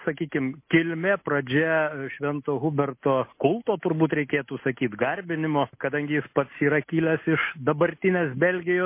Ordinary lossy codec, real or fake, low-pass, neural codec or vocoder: MP3, 24 kbps; real; 3.6 kHz; none